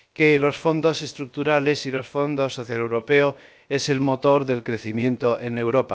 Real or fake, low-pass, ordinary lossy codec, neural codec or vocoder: fake; none; none; codec, 16 kHz, about 1 kbps, DyCAST, with the encoder's durations